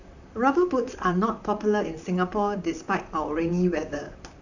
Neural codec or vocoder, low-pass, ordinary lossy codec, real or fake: vocoder, 44.1 kHz, 128 mel bands, Pupu-Vocoder; 7.2 kHz; none; fake